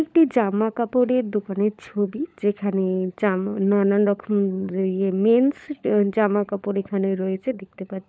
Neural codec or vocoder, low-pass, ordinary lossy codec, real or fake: codec, 16 kHz, 16 kbps, FunCodec, trained on LibriTTS, 50 frames a second; none; none; fake